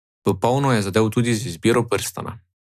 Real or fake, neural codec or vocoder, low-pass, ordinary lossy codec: real; none; 14.4 kHz; none